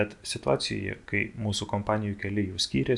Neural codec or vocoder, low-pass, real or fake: none; 10.8 kHz; real